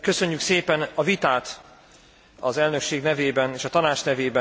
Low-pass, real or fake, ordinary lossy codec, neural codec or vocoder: none; real; none; none